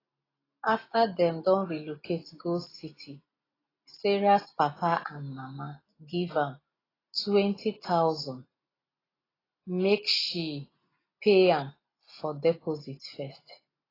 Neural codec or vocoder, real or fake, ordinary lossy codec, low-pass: none; real; AAC, 24 kbps; 5.4 kHz